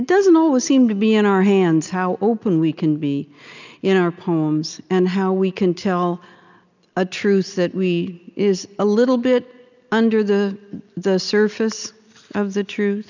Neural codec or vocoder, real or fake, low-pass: none; real; 7.2 kHz